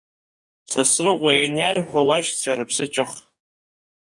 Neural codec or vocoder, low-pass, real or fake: codec, 44.1 kHz, 2.6 kbps, DAC; 10.8 kHz; fake